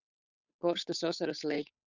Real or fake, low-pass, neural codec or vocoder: fake; 7.2 kHz; codec, 16 kHz, 8 kbps, FunCodec, trained on Chinese and English, 25 frames a second